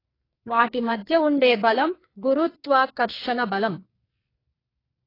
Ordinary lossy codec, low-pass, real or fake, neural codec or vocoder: AAC, 24 kbps; 5.4 kHz; fake; codec, 44.1 kHz, 2.6 kbps, SNAC